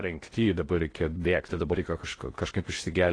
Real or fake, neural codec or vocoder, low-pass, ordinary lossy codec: fake; codec, 16 kHz in and 24 kHz out, 0.6 kbps, FocalCodec, streaming, 2048 codes; 9.9 kHz; AAC, 32 kbps